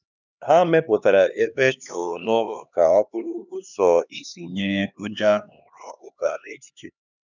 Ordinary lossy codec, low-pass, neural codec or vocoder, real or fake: none; 7.2 kHz; codec, 16 kHz, 2 kbps, X-Codec, HuBERT features, trained on LibriSpeech; fake